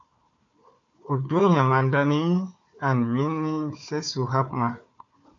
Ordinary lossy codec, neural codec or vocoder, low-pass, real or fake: AAC, 48 kbps; codec, 16 kHz, 4 kbps, FunCodec, trained on Chinese and English, 50 frames a second; 7.2 kHz; fake